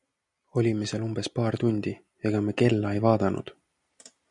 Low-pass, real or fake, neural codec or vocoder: 10.8 kHz; real; none